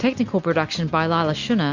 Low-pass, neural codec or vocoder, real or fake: 7.2 kHz; none; real